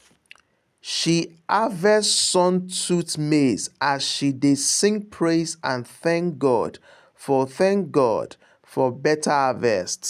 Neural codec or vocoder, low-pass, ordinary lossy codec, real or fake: none; 14.4 kHz; none; real